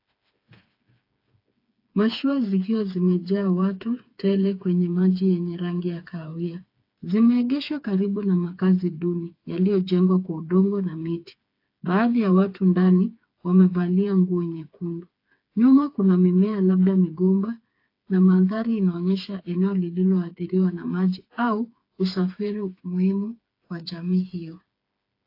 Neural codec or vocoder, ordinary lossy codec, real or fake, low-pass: codec, 16 kHz, 4 kbps, FreqCodec, smaller model; AAC, 32 kbps; fake; 5.4 kHz